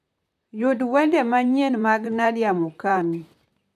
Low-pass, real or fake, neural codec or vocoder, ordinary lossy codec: 14.4 kHz; fake; vocoder, 44.1 kHz, 128 mel bands, Pupu-Vocoder; none